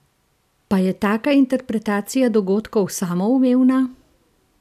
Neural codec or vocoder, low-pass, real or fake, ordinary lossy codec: none; 14.4 kHz; real; none